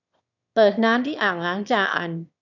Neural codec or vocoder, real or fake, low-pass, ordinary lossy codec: autoencoder, 22.05 kHz, a latent of 192 numbers a frame, VITS, trained on one speaker; fake; 7.2 kHz; none